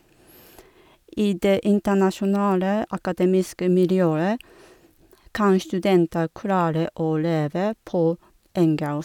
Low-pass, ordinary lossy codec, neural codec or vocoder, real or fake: 19.8 kHz; none; none; real